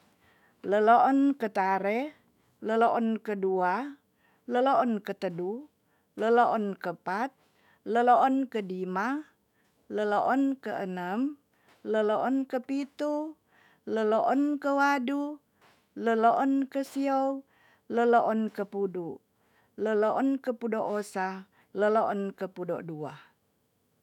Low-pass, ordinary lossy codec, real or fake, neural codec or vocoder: 19.8 kHz; none; fake; autoencoder, 48 kHz, 128 numbers a frame, DAC-VAE, trained on Japanese speech